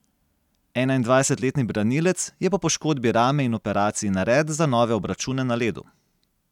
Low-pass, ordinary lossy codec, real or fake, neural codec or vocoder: 19.8 kHz; none; real; none